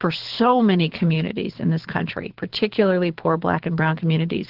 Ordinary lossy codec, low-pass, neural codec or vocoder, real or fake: Opus, 16 kbps; 5.4 kHz; codec, 24 kHz, 6 kbps, HILCodec; fake